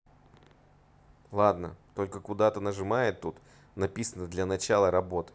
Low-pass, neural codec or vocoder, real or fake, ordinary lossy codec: none; none; real; none